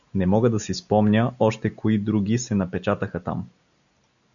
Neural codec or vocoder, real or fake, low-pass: none; real; 7.2 kHz